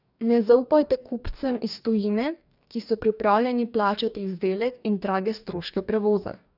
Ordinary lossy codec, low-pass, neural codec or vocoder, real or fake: none; 5.4 kHz; codec, 44.1 kHz, 2.6 kbps, DAC; fake